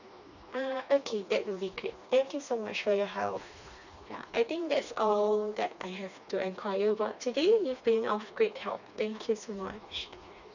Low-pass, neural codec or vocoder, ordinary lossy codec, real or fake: 7.2 kHz; codec, 16 kHz, 2 kbps, FreqCodec, smaller model; none; fake